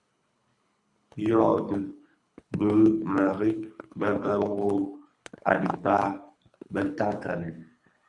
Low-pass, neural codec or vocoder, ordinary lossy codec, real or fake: 10.8 kHz; codec, 24 kHz, 3 kbps, HILCodec; Opus, 64 kbps; fake